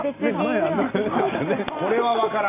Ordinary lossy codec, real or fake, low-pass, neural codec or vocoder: AAC, 32 kbps; real; 3.6 kHz; none